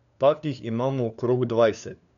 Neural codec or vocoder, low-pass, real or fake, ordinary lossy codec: codec, 16 kHz, 2 kbps, FunCodec, trained on LibriTTS, 25 frames a second; 7.2 kHz; fake; none